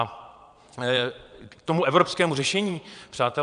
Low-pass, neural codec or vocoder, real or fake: 9.9 kHz; vocoder, 22.05 kHz, 80 mel bands, Vocos; fake